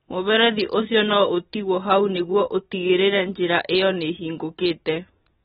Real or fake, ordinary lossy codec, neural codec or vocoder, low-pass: real; AAC, 16 kbps; none; 9.9 kHz